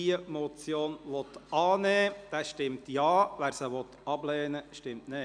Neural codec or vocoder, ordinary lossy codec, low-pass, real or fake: none; none; 9.9 kHz; real